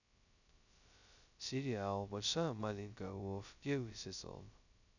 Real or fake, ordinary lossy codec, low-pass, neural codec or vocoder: fake; none; 7.2 kHz; codec, 16 kHz, 0.2 kbps, FocalCodec